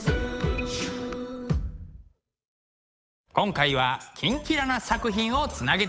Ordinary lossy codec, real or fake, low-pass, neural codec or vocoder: none; fake; none; codec, 16 kHz, 8 kbps, FunCodec, trained on Chinese and English, 25 frames a second